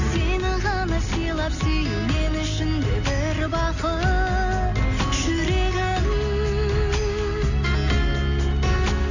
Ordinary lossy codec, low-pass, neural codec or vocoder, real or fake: none; 7.2 kHz; none; real